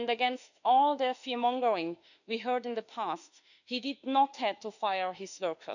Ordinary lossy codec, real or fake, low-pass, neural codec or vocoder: none; fake; 7.2 kHz; autoencoder, 48 kHz, 32 numbers a frame, DAC-VAE, trained on Japanese speech